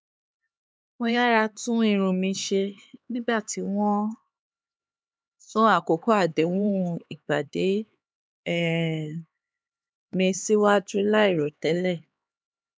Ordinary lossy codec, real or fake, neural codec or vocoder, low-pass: none; fake; codec, 16 kHz, 4 kbps, X-Codec, HuBERT features, trained on LibriSpeech; none